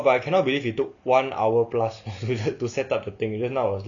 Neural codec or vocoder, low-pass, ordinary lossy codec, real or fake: none; 7.2 kHz; none; real